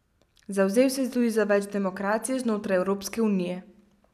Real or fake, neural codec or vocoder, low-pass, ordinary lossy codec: real; none; 14.4 kHz; none